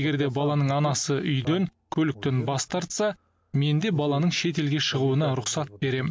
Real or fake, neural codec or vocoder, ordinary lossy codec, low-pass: real; none; none; none